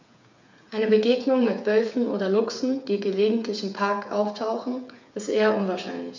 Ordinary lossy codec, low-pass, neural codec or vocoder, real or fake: MP3, 64 kbps; 7.2 kHz; codec, 16 kHz, 8 kbps, FreqCodec, smaller model; fake